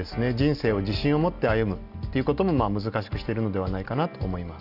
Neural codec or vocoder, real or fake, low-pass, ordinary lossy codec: none; real; 5.4 kHz; none